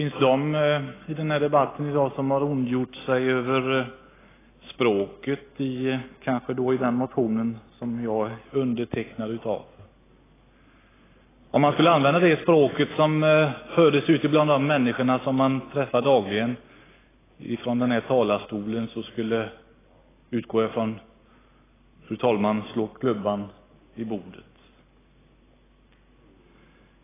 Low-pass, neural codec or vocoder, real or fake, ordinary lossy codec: 3.6 kHz; none; real; AAC, 16 kbps